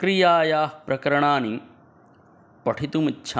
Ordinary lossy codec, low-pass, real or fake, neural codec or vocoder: none; none; real; none